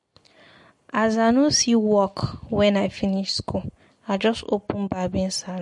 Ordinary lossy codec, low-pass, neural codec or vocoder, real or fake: MP3, 48 kbps; 10.8 kHz; none; real